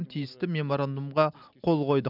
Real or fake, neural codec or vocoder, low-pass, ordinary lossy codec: real; none; 5.4 kHz; none